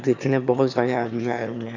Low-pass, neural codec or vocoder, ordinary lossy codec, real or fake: 7.2 kHz; autoencoder, 22.05 kHz, a latent of 192 numbers a frame, VITS, trained on one speaker; none; fake